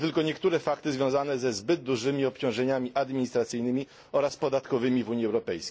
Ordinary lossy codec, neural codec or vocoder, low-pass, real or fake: none; none; none; real